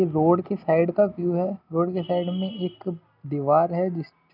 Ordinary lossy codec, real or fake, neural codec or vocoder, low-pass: none; real; none; 5.4 kHz